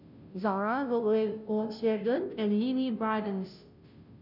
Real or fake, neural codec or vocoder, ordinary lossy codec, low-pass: fake; codec, 16 kHz, 0.5 kbps, FunCodec, trained on Chinese and English, 25 frames a second; none; 5.4 kHz